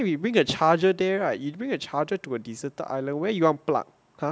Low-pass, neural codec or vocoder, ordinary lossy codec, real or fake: none; none; none; real